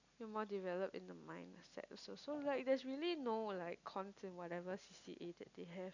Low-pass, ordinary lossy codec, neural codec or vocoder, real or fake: 7.2 kHz; none; none; real